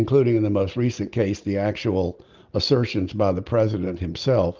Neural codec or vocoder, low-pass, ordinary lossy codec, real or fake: none; 7.2 kHz; Opus, 32 kbps; real